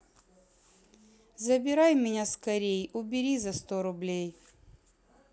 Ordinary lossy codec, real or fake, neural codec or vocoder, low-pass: none; real; none; none